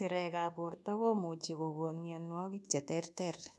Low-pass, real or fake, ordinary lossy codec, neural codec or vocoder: none; fake; none; codec, 24 kHz, 1.2 kbps, DualCodec